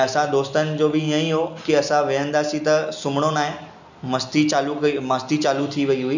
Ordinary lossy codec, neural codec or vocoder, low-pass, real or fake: none; none; 7.2 kHz; real